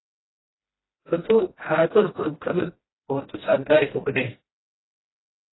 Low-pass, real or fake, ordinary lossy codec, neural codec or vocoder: 7.2 kHz; fake; AAC, 16 kbps; codec, 16 kHz, 1 kbps, FreqCodec, smaller model